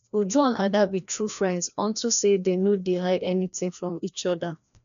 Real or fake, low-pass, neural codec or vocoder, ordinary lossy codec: fake; 7.2 kHz; codec, 16 kHz, 1 kbps, FreqCodec, larger model; none